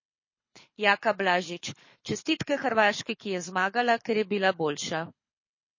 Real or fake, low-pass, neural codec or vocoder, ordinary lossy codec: fake; 7.2 kHz; codec, 24 kHz, 6 kbps, HILCodec; MP3, 32 kbps